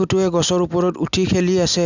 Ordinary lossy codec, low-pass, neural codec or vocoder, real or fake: none; 7.2 kHz; none; real